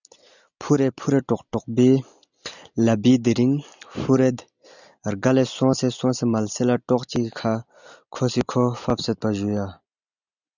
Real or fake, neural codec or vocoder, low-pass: real; none; 7.2 kHz